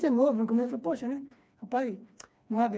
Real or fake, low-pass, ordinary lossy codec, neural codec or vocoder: fake; none; none; codec, 16 kHz, 2 kbps, FreqCodec, smaller model